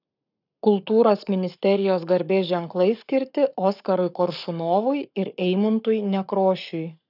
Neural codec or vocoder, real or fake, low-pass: codec, 44.1 kHz, 7.8 kbps, Pupu-Codec; fake; 5.4 kHz